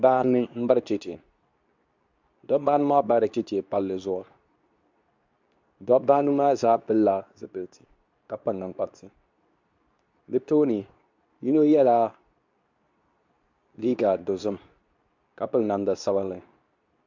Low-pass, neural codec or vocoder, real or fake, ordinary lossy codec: 7.2 kHz; codec, 24 kHz, 0.9 kbps, WavTokenizer, medium speech release version 2; fake; MP3, 64 kbps